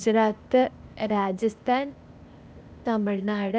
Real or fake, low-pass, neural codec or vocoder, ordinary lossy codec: fake; none; codec, 16 kHz, 0.8 kbps, ZipCodec; none